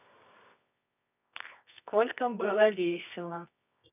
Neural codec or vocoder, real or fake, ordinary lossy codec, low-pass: codec, 24 kHz, 0.9 kbps, WavTokenizer, medium music audio release; fake; none; 3.6 kHz